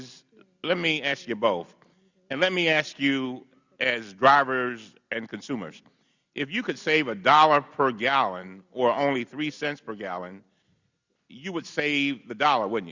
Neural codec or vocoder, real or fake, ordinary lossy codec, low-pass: none; real; Opus, 64 kbps; 7.2 kHz